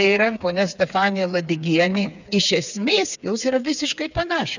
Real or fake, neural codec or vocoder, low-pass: fake; codec, 16 kHz, 4 kbps, FreqCodec, smaller model; 7.2 kHz